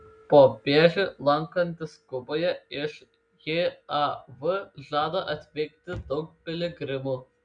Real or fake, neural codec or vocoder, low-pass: real; none; 9.9 kHz